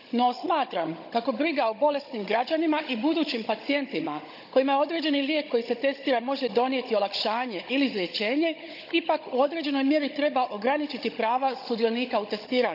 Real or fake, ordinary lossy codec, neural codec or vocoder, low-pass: fake; none; codec, 16 kHz, 16 kbps, FunCodec, trained on Chinese and English, 50 frames a second; 5.4 kHz